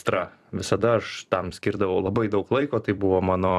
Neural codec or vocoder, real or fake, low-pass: vocoder, 44.1 kHz, 128 mel bands every 256 samples, BigVGAN v2; fake; 14.4 kHz